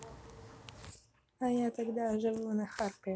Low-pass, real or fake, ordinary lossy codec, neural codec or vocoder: none; real; none; none